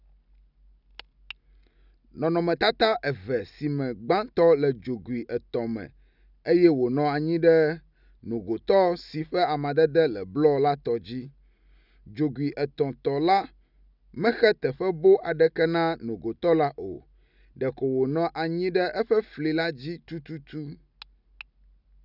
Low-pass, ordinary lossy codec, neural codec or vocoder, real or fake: 5.4 kHz; none; none; real